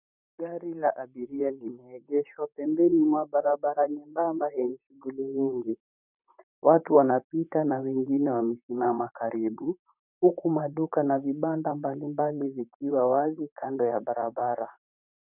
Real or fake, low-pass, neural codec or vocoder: fake; 3.6 kHz; vocoder, 44.1 kHz, 128 mel bands, Pupu-Vocoder